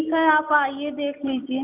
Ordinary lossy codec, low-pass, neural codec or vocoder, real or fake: none; 3.6 kHz; none; real